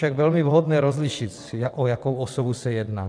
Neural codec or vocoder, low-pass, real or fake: vocoder, 22.05 kHz, 80 mel bands, WaveNeXt; 9.9 kHz; fake